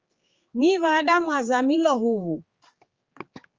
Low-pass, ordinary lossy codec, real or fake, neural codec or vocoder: 7.2 kHz; Opus, 32 kbps; fake; codec, 16 kHz, 4 kbps, X-Codec, HuBERT features, trained on general audio